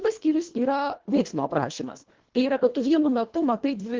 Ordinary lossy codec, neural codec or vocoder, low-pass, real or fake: Opus, 16 kbps; codec, 24 kHz, 1.5 kbps, HILCodec; 7.2 kHz; fake